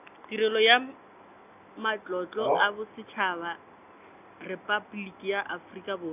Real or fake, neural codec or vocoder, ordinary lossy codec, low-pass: real; none; none; 3.6 kHz